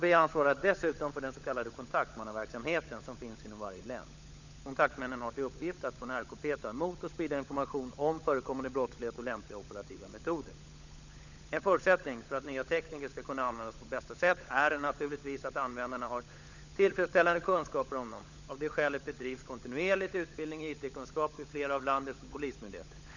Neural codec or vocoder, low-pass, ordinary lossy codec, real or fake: codec, 16 kHz, 16 kbps, FunCodec, trained on LibriTTS, 50 frames a second; 7.2 kHz; none; fake